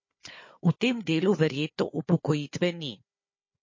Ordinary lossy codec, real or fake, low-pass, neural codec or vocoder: MP3, 32 kbps; fake; 7.2 kHz; codec, 16 kHz, 4 kbps, FunCodec, trained on Chinese and English, 50 frames a second